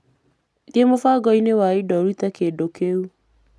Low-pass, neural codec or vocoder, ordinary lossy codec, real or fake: none; none; none; real